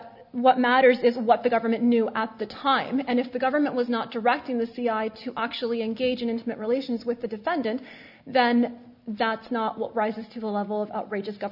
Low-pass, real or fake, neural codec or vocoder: 5.4 kHz; real; none